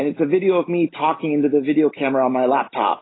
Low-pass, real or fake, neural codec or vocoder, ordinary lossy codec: 7.2 kHz; real; none; AAC, 16 kbps